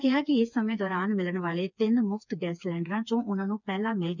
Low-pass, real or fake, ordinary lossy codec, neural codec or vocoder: 7.2 kHz; fake; none; codec, 16 kHz, 4 kbps, FreqCodec, smaller model